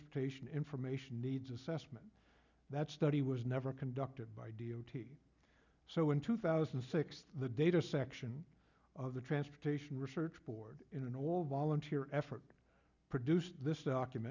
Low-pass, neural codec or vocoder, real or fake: 7.2 kHz; none; real